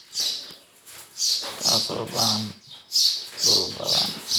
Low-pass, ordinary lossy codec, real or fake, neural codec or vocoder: none; none; fake; vocoder, 44.1 kHz, 128 mel bands, Pupu-Vocoder